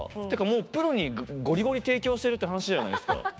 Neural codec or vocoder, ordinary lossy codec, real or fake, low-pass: codec, 16 kHz, 6 kbps, DAC; none; fake; none